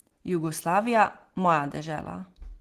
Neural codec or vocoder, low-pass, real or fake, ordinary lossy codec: none; 14.4 kHz; real; Opus, 16 kbps